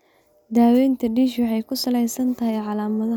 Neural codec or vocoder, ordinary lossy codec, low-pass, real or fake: none; none; 19.8 kHz; real